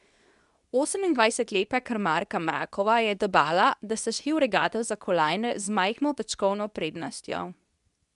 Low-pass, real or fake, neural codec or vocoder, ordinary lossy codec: 10.8 kHz; fake; codec, 24 kHz, 0.9 kbps, WavTokenizer, medium speech release version 2; none